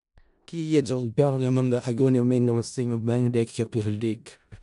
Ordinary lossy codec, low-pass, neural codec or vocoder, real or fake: none; 10.8 kHz; codec, 16 kHz in and 24 kHz out, 0.4 kbps, LongCat-Audio-Codec, four codebook decoder; fake